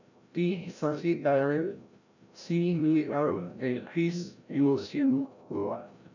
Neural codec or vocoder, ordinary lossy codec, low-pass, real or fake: codec, 16 kHz, 0.5 kbps, FreqCodec, larger model; none; 7.2 kHz; fake